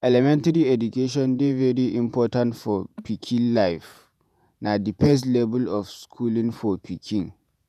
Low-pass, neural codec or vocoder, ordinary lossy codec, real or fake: 14.4 kHz; vocoder, 48 kHz, 128 mel bands, Vocos; none; fake